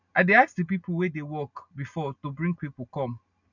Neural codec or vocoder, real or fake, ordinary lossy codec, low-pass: none; real; none; 7.2 kHz